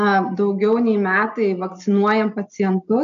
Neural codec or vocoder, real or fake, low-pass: none; real; 7.2 kHz